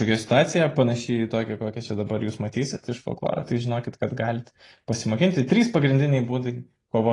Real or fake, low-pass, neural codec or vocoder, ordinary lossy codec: real; 10.8 kHz; none; AAC, 32 kbps